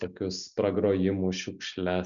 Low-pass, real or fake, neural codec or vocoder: 7.2 kHz; real; none